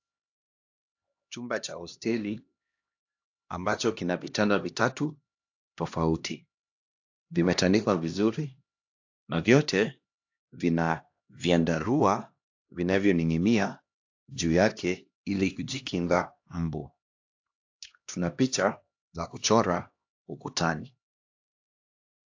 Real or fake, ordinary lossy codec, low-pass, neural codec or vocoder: fake; AAC, 48 kbps; 7.2 kHz; codec, 16 kHz, 1 kbps, X-Codec, HuBERT features, trained on LibriSpeech